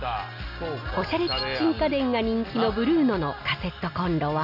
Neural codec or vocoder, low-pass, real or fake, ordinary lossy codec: none; 5.4 kHz; real; none